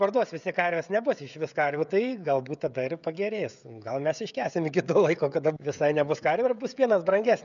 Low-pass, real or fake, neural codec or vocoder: 7.2 kHz; fake; codec, 16 kHz, 16 kbps, FreqCodec, smaller model